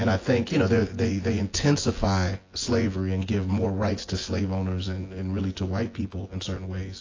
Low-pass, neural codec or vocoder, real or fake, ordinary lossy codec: 7.2 kHz; vocoder, 24 kHz, 100 mel bands, Vocos; fake; AAC, 32 kbps